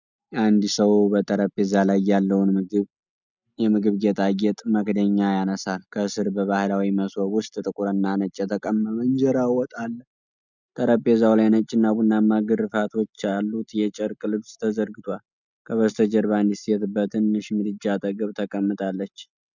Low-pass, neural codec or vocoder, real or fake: 7.2 kHz; none; real